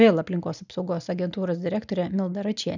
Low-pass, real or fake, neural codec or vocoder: 7.2 kHz; real; none